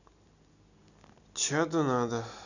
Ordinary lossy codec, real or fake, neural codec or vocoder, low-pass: none; real; none; 7.2 kHz